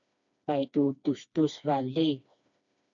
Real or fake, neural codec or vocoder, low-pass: fake; codec, 16 kHz, 2 kbps, FreqCodec, smaller model; 7.2 kHz